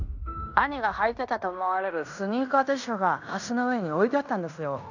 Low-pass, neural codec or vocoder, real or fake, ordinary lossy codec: 7.2 kHz; codec, 16 kHz in and 24 kHz out, 0.9 kbps, LongCat-Audio-Codec, fine tuned four codebook decoder; fake; none